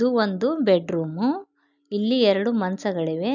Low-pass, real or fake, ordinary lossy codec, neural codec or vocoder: 7.2 kHz; real; none; none